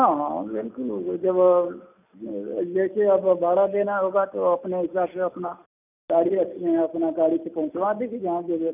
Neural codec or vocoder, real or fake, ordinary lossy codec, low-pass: none; real; none; 3.6 kHz